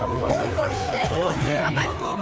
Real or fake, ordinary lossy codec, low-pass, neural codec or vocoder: fake; none; none; codec, 16 kHz, 4 kbps, FreqCodec, larger model